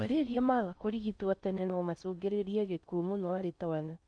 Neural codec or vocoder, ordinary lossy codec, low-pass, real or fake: codec, 16 kHz in and 24 kHz out, 0.6 kbps, FocalCodec, streaming, 4096 codes; none; 9.9 kHz; fake